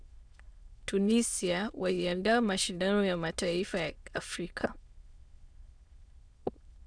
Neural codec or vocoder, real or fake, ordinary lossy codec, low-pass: autoencoder, 22.05 kHz, a latent of 192 numbers a frame, VITS, trained on many speakers; fake; Opus, 64 kbps; 9.9 kHz